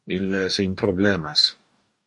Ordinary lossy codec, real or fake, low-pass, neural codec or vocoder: MP3, 48 kbps; fake; 10.8 kHz; codec, 44.1 kHz, 2.6 kbps, DAC